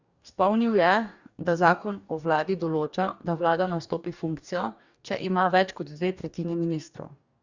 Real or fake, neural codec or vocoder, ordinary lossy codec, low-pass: fake; codec, 44.1 kHz, 2.6 kbps, DAC; Opus, 64 kbps; 7.2 kHz